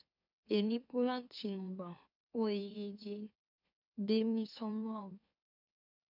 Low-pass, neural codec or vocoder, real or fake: 5.4 kHz; autoencoder, 44.1 kHz, a latent of 192 numbers a frame, MeloTTS; fake